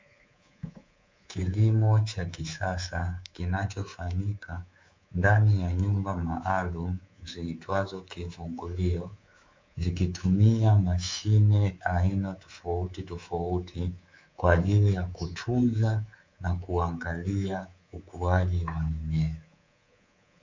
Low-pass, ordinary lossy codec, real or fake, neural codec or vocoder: 7.2 kHz; MP3, 64 kbps; fake; codec, 24 kHz, 3.1 kbps, DualCodec